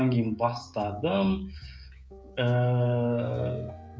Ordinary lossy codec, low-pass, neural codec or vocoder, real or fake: none; none; codec, 16 kHz, 16 kbps, FreqCodec, smaller model; fake